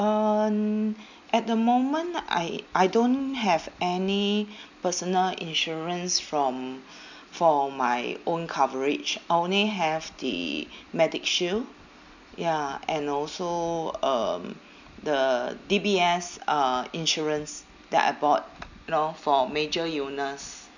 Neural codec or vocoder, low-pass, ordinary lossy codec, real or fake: none; 7.2 kHz; none; real